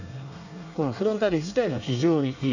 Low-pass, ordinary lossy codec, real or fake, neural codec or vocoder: 7.2 kHz; none; fake; codec, 24 kHz, 1 kbps, SNAC